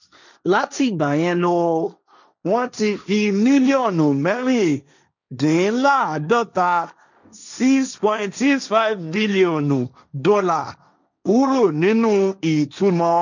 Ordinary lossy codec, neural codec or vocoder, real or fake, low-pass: none; codec, 16 kHz, 1.1 kbps, Voila-Tokenizer; fake; 7.2 kHz